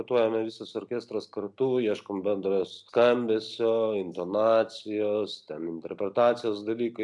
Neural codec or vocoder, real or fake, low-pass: none; real; 10.8 kHz